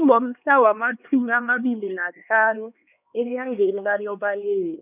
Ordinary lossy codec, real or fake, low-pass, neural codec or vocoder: none; fake; 3.6 kHz; codec, 16 kHz, 2 kbps, X-Codec, HuBERT features, trained on LibriSpeech